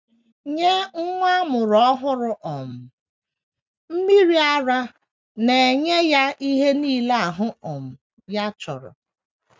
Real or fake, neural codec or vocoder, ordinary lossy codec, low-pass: real; none; none; none